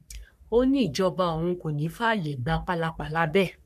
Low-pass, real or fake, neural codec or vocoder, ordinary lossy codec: 14.4 kHz; fake; codec, 44.1 kHz, 3.4 kbps, Pupu-Codec; none